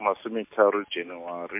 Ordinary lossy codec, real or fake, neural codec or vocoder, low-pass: MP3, 32 kbps; real; none; 3.6 kHz